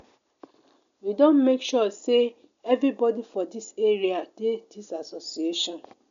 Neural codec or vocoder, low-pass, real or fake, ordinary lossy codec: none; 7.2 kHz; real; none